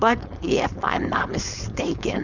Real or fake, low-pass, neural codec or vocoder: fake; 7.2 kHz; codec, 16 kHz, 4.8 kbps, FACodec